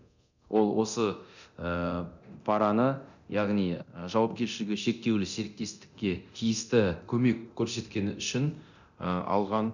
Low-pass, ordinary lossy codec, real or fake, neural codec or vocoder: 7.2 kHz; none; fake; codec, 24 kHz, 0.9 kbps, DualCodec